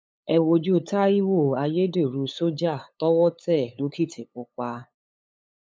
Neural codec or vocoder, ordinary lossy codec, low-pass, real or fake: codec, 16 kHz, 8 kbps, FunCodec, trained on LibriTTS, 25 frames a second; none; none; fake